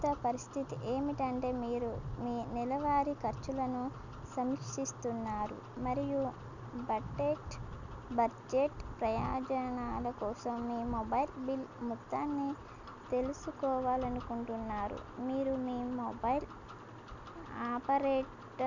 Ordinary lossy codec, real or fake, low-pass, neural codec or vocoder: none; real; 7.2 kHz; none